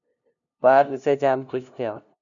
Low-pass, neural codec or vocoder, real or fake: 7.2 kHz; codec, 16 kHz, 0.5 kbps, FunCodec, trained on LibriTTS, 25 frames a second; fake